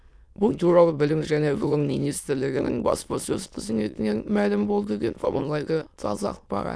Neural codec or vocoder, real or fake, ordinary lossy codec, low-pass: autoencoder, 22.05 kHz, a latent of 192 numbers a frame, VITS, trained on many speakers; fake; none; none